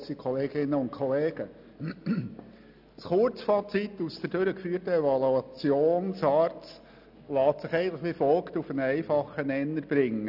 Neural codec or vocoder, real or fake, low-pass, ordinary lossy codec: none; real; 5.4 kHz; none